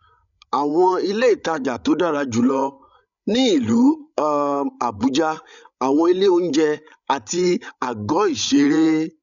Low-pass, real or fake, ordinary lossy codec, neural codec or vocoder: 7.2 kHz; fake; MP3, 96 kbps; codec, 16 kHz, 8 kbps, FreqCodec, larger model